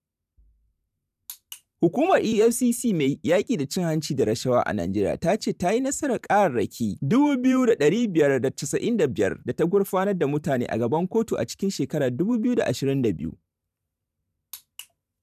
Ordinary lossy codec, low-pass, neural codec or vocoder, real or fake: none; 14.4 kHz; vocoder, 44.1 kHz, 128 mel bands every 512 samples, BigVGAN v2; fake